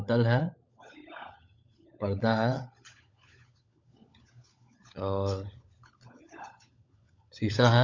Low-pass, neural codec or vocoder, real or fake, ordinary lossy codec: 7.2 kHz; codec, 16 kHz, 16 kbps, FunCodec, trained on LibriTTS, 50 frames a second; fake; MP3, 64 kbps